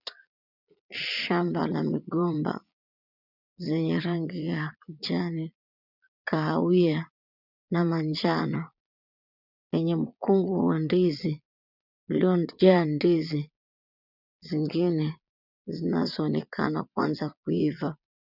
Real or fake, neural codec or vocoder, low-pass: fake; vocoder, 22.05 kHz, 80 mel bands, Vocos; 5.4 kHz